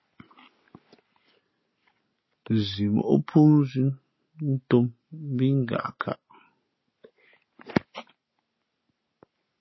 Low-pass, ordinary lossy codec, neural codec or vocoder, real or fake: 7.2 kHz; MP3, 24 kbps; none; real